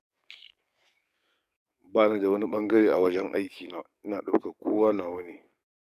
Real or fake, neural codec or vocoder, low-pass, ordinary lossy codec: fake; codec, 44.1 kHz, 7.8 kbps, DAC; 14.4 kHz; none